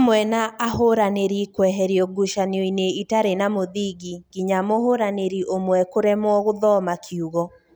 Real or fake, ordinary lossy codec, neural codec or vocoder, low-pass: real; none; none; none